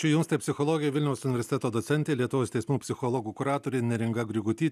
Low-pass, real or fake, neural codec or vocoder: 14.4 kHz; real; none